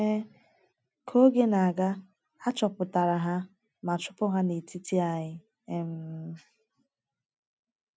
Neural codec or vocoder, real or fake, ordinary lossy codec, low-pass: none; real; none; none